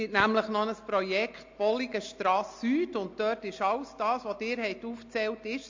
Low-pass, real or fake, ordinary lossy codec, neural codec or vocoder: 7.2 kHz; real; MP3, 48 kbps; none